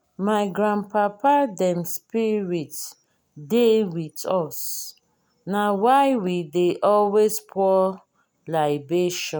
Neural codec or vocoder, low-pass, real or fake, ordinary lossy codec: none; none; real; none